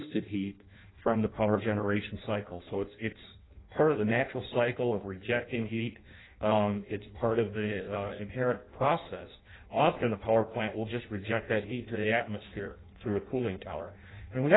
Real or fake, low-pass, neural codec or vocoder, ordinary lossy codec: fake; 7.2 kHz; codec, 16 kHz in and 24 kHz out, 0.6 kbps, FireRedTTS-2 codec; AAC, 16 kbps